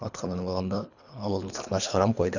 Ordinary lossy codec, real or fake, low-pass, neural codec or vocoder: none; fake; 7.2 kHz; codec, 24 kHz, 3 kbps, HILCodec